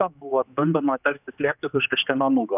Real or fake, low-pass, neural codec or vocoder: fake; 3.6 kHz; codec, 16 kHz, 2 kbps, X-Codec, HuBERT features, trained on general audio